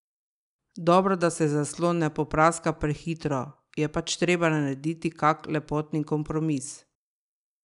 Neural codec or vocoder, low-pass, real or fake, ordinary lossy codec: none; 10.8 kHz; real; none